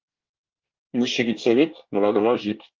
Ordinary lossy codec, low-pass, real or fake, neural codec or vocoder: Opus, 32 kbps; 7.2 kHz; fake; codec, 24 kHz, 1 kbps, SNAC